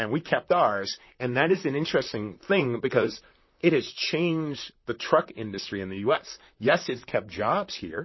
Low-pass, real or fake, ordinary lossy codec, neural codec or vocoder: 7.2 kHz; fake; MP3, 24 kbps; vocoder, 44.1 kHz, 128 mel bands, Pupu-Vocoder